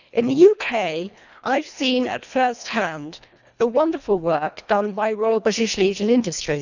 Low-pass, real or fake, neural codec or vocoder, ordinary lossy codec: 7.2 kHz; fake; codec, 24 kHz, 1.5 kbps, HILCodec; none